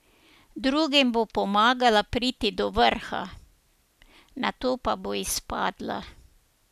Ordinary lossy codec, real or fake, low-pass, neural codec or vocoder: none; real; 14.4 kHz; none